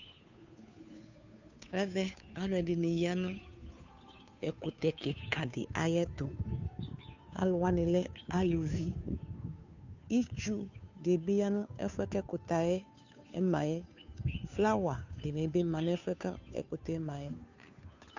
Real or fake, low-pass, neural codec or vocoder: fake; 7.2 kHz; codec, 16 kHz, 2 kbps, FunCodec, trained on Chinese and English, 25 frames a second